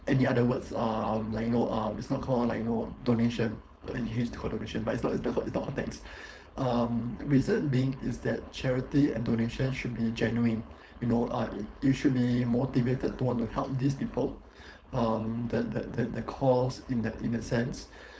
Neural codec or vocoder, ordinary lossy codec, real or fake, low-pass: codec, 16 kHz, 4.8 kbps, FACodec; none; fake; none